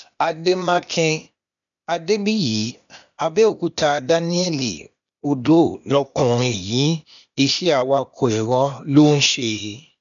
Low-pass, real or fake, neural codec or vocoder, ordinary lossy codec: 7.2 kHz; fake; codec, 16 kHz, 0.8 kbps, ZipCodec; none